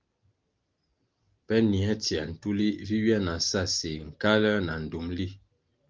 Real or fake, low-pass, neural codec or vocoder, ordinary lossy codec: real; 7.2 kHz; none; Opus, 32 kbps